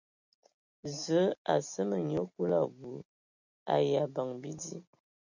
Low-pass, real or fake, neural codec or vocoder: 7.2 kHz; real; none